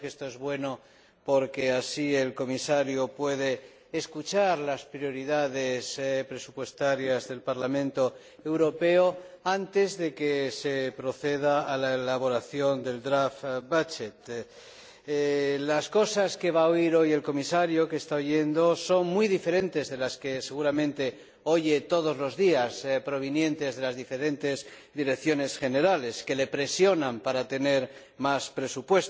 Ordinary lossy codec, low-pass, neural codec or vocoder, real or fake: none; none; none; real